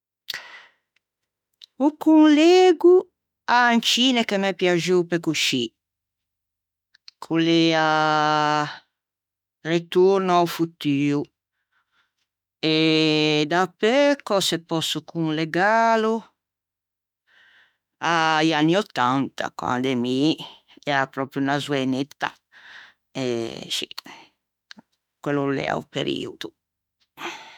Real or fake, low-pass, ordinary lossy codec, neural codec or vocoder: fake; 19.8 kHz; none; autoencoder, 48 kHz, 32 numbers a frame, DAC-VAE, trained on Japanese speech